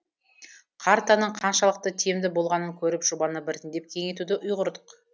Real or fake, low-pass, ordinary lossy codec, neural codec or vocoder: real; none; none; none